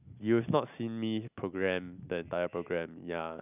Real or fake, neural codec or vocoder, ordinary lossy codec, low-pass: real; none; none; 3.6 kHz